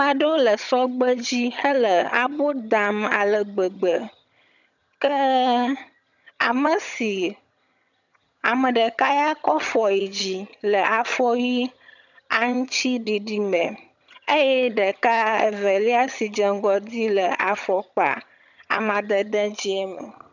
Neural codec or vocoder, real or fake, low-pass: vocoder, 22.05 kHz, 80 mel bands, HiFi-GAN; fake; 7.2 kHz